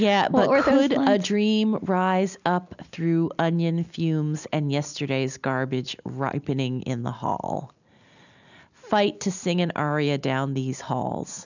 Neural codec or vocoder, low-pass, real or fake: none; 7.2 kHz; real